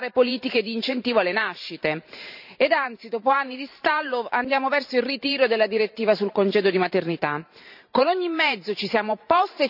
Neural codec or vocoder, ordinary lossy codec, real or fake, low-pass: vocoder, 44.1 kHz, 128 mel bands every 512 samples, BigVGAN v2; none; fake; 5.4 kHz